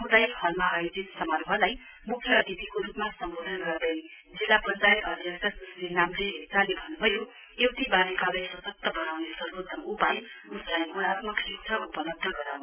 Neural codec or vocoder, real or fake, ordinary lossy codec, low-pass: none; real; none; 3.6 kHz